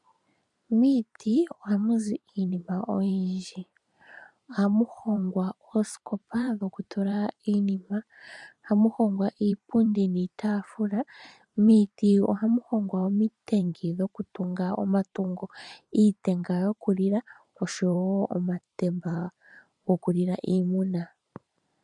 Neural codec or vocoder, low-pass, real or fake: vocoder, 44.1 kHz, 128 mel bands every 512 samples, BigVGAN v2; 10.8 kHz; fake